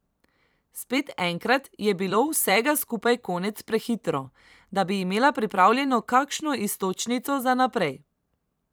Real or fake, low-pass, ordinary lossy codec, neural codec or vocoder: fake; none; none; vocoder, 44.1 kHz, 128 mel bands every 256 samples, BigVGAN v2